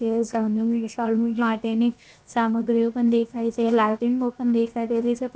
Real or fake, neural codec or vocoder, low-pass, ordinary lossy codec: fake; codec, 16 kHz, 0.7 kbps, FocalCodec; none; none